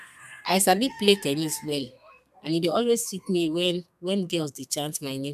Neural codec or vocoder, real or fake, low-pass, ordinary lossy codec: codec, 32 kHz, 1.9 kbps, SNAC; fake; 14.4 kHz; none